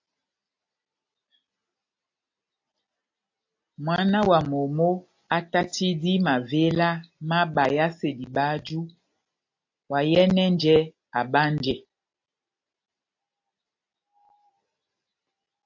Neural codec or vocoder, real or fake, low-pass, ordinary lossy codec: none; real; 7.2 kHz; AAC, 48 kbps